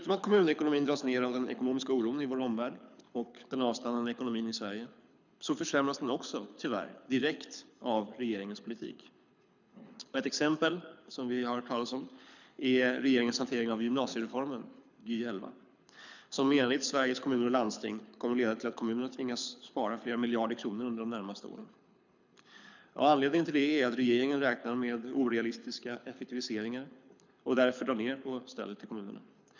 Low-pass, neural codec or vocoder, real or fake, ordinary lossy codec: 7.2 kHz; codec, 24 kHz, 6 kbps, HILCodec; fake; none